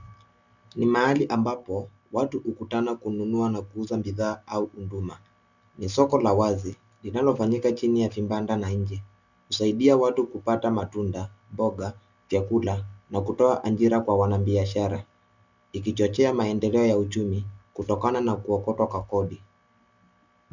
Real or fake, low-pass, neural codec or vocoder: real; 7.2 kHz; none